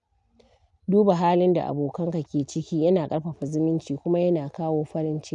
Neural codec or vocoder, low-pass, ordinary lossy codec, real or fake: none; 10.8 kHz; none; real